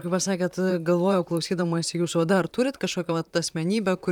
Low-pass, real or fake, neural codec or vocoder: 19.8 kHz; fake; vocoder, 44.1 kHz, 128 mel bands, Pupu-Vocoder